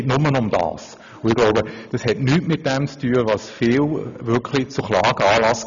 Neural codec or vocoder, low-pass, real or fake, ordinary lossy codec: none; 7.2 kHz; real; none